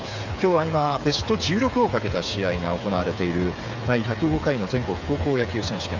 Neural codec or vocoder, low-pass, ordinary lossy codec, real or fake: codec, 16 kHz, 8 kbps, FreqCodec, smaller model; 7.2 kHz; none; fake